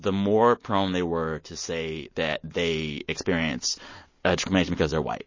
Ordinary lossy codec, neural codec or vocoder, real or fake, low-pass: MP3, 32 kbps; none; real; 7.2 kHz